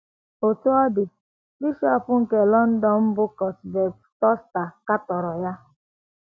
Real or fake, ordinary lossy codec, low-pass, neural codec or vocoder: real; none; none; none